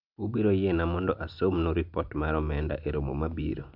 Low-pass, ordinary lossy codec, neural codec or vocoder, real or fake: 5.4 kHz; none; none; real